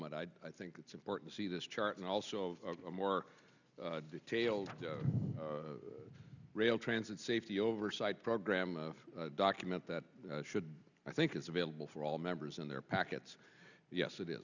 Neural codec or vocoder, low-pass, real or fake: none; 7.2 kHz; real